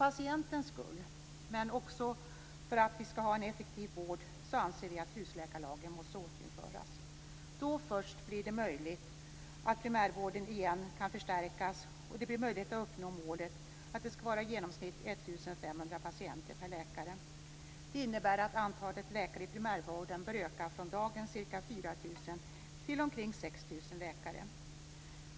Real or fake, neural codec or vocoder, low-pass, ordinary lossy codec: real; none; none; none